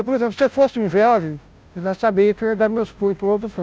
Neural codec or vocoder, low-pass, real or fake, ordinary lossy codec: codec, 16 kHz, 0.5 kbps, FunCodec, trained on Chinese and English, 25 frames a second; none; fake; none